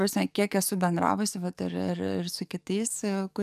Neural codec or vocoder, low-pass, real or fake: codec, 44.1 kHz, 7.8 kbps, DAC; 14.4 kHz; fake